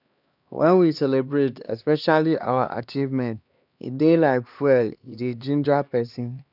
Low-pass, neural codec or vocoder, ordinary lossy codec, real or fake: 5.4 kHz; codec, 16 kHz, 2 kbps, X-Codec, HuBERT features, trained on LibriSpeech; none; fake